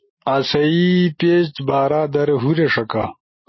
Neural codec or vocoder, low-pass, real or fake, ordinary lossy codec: none; 7.2 kHz; real; MP3, 24 kbps